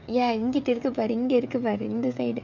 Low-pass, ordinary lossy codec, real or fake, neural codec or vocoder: 7.2 kHz; none; fake; codec, 16 kHz, 16 kbps, FreqCodec, smaller model